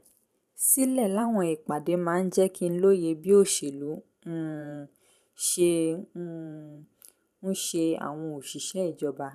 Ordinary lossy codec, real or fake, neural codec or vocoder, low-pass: none; fake; vocoder, 44.1 kHz, 128 mel bands every 512 samples, BigVGAN v2; 14.4 kHz